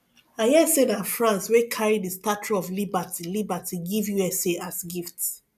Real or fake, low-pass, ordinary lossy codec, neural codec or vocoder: real; 14.4 kHz; none; none